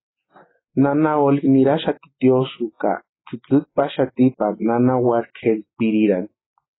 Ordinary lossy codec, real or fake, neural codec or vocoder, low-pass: AAC, 16 kbps; real; none; 7.2 kHz